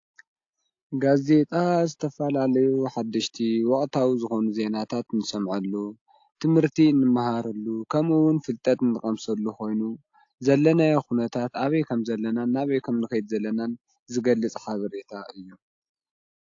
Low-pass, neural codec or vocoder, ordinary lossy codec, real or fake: 7.2 kHz; none; AAC, 48 kbps; real